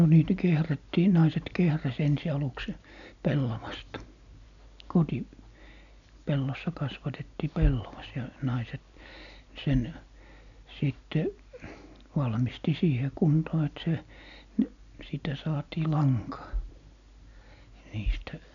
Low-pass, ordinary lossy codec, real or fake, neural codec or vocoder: 7.2 kHz; none; real; none